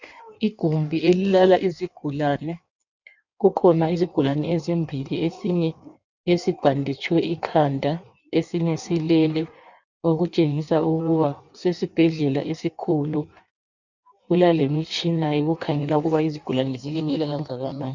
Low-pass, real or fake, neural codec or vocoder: 7.2 kHz; fake; codec, 16 kHz in and 24 kHz out, 1.1 kbps, FireRedTTS-2 codec